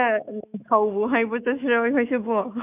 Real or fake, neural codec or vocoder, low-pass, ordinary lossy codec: fake; autoencoder, 48 kHz, 128 numbers a frame, DAC-VAE, trained on Japanese speech; 3.6 kHz; none